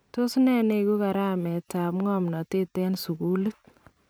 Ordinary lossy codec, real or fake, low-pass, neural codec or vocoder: none; fake; none; vocoder, 44.1 kHz, 128 mel bands every 512 samples, BigVGAN v2